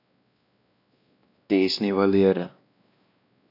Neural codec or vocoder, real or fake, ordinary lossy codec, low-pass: codec, 16 kHz, 1 kbps, X-Codec, WavLM features, trained on Multilingual LibriSpeech; fake; none; 5.4 kHz